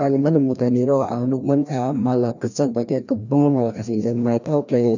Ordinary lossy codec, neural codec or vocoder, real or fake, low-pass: none; codec, 16 kHz, 1 kbps, FreqCodec, larger model; fake; 7.2 kHz